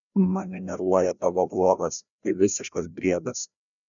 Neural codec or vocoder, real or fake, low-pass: codec, 16 kHz, 1 kbps, FreqCodec, larger model; fake; 7.2 kHz